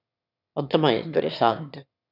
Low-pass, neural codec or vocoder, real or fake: 5.4 kHz; autoencoder, 22.05 kHz, a latent of 192 numbers a frame, VITS, trained on one speaker; fake